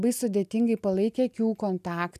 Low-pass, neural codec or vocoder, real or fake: 14.4 kHz; none; real